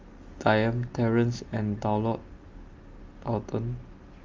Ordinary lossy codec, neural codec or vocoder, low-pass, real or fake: Opus, 32 kbps; none; 7.2 kHz; real